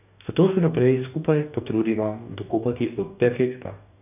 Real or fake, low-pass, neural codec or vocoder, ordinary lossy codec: fake; 3.6 kHz; codec, 44.1 kHz, 2.6 kbps, DAC; none